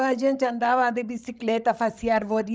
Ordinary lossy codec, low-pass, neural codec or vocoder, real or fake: none; none; codec, 16 kHz, 16 kbps, FreqCodec, larger model; fake